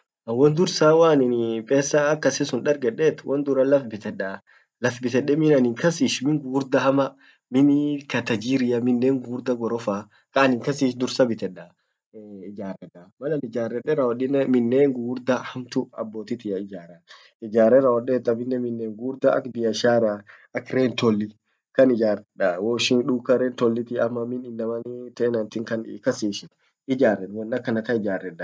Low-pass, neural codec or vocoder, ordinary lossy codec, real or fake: none; none; none; real